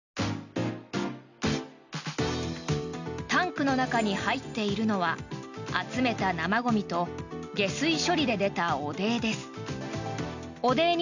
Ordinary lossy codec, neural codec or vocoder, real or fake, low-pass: none; none; real; 7.2 kHz